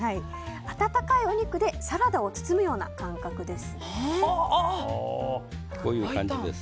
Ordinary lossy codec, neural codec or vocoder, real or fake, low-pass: none; none; real; none